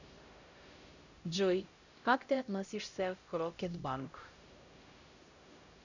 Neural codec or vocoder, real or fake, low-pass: codec, 16 kHz, 0.5 kbps, X-Codec, HuBERT features, trained on LibriSpeech; fake; 7.2 kHz